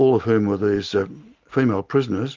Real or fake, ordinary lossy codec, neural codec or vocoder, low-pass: real; Opus, 32 kbps; none; 7.2 kHz